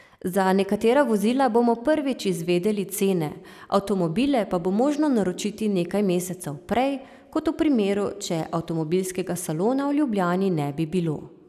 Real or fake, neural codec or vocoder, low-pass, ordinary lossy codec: real; none; 14.4 kHz; none